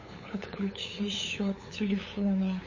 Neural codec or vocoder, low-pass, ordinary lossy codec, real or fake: codec, 16 kHz, 8 kbps, FunCodec, trained on LibriTTS, 25 frames a second; 7.2 kHz; MP3, 32 kbps; fake